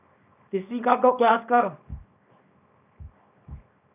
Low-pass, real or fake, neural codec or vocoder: 3.6 kHz; fake; codec, 24 kHz, 0.9 kbps, WavTokenizer, small release